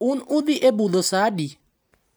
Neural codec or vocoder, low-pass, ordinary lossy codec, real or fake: none; none; none; real